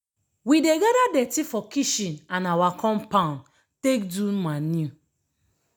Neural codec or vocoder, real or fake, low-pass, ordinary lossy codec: none; real; none; none